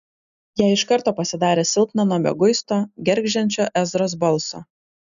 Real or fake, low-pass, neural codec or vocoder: real; 7.2 kHz; none